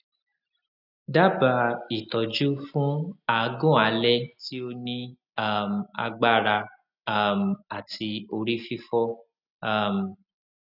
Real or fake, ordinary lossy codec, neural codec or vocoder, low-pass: real; none; none; 5.4 kHz